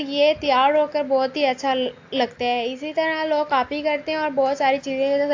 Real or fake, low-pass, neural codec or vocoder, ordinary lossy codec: real; 7.2 kHz; none; AAC, 48 kbps